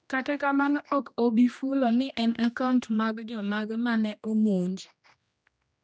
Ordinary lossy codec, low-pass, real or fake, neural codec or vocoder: none; none; fake; codec, 16 kHz, 1 kbps, X-Codec, HuBERT features, trained on general audio